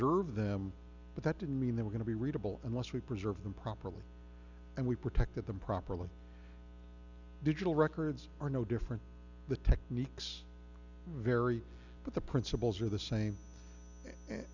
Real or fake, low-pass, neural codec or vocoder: real; 7.2 kHz; none